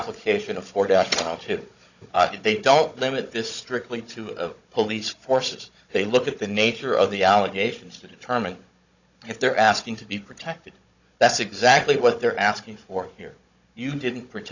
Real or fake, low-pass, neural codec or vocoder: fake; 7.2 kHz; codec, 16 kHz, 16 kbps, FunCodec, trained on Chinese and English, 50 frames a second